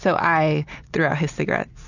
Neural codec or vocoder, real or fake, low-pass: none; real; 7.2 kHz